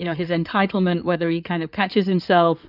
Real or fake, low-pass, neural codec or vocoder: fake; 5.4 kHz; codec, 16 kHz in and 24 kHz out, 2.2 kbps, FireRedTTS-2 codec